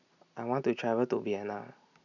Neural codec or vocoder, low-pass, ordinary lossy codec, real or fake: none; 7.2 kHz; none; real